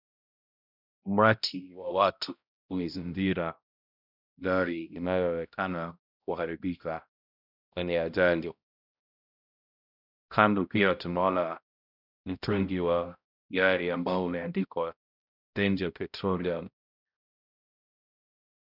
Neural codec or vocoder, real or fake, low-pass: codec, 16 kHz, 0.5 kbps, X-Codec, HuBERT features, trained on balanced general audio; fake; 5.4 kHz